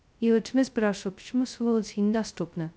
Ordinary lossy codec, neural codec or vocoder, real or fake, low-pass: none; codec, 16 kHz, 0.2 kbps, FocalCodec; fake; none